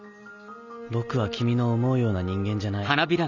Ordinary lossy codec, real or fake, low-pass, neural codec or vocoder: none; real; 7.2 kHz; none